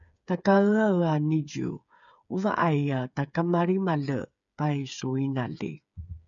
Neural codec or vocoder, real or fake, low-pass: codec, 16 kHz, 8 kbps, FreqCodec, smaller model; fake; 7.2 kHz